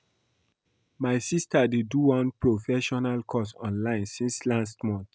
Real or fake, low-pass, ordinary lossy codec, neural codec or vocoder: real; none; none; none